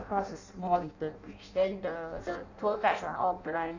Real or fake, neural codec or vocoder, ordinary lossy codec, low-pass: fake; codec, 16 kHz in and 24 kHz out, 0.6 kbps, FireRedTTS-2 codec; none; 7.2 kHz